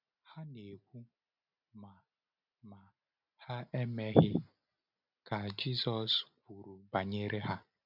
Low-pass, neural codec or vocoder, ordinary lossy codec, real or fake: 5.4 kHz; none; none; real